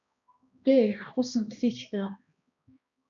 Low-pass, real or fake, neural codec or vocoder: 7.2 kHz; fake; codec, 16 kHz, 1 kbps, X-Codec, HuBERT features, trained on balanced general audio